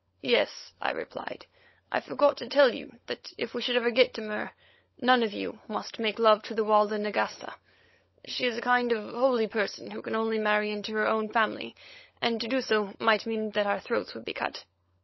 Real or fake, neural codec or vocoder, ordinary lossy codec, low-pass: fake; codec, 16 kHz, 16 kbps, FunCodec, trained on LibriTTS, 50 frames a second; MP3, 24 kbps; 7.2 kHz